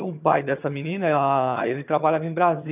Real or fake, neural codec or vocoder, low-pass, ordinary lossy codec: fake; vocoder, 22.05 kHz, 80 mel bands, HiFi-GAN; 3.6 kHz; none